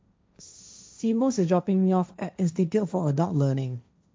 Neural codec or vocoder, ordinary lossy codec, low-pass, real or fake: codec, 16 kHz, 1.1 kbps, Voila-Tokenizer; none; none; fake